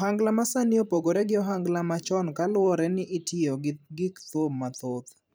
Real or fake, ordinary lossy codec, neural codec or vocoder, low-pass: fake; none; vocoder, 44.1 kHz, 128 mel bands every 512 samples, BigVGAN v2; none